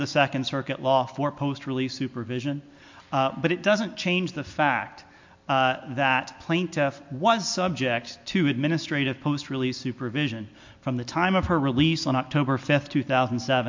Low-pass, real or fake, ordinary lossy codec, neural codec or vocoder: 7.2 kHz; real; MP3, 48 kbps; none